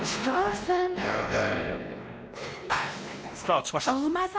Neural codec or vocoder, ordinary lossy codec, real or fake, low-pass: codec, 16 kHz, 1 kbps, X-Codec, WavLM features, trained on Multilingual LibriSpeech; none; fake; none